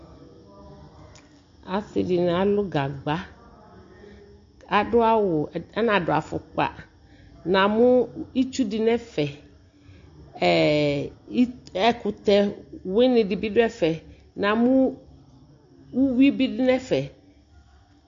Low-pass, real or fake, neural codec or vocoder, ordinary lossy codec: 7.2 kHz; real; none; MP3, 48 kbps